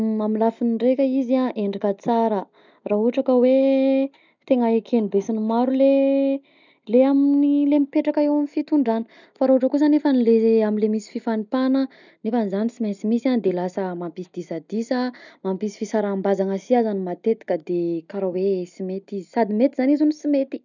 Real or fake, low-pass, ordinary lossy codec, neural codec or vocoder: real; 7.2 kHz; none; none